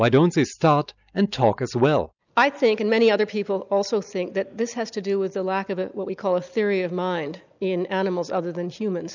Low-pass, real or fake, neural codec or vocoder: 7.2 kHz; real; none